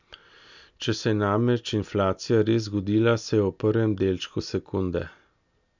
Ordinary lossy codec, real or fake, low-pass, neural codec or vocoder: none; real; 7.2 kHz; none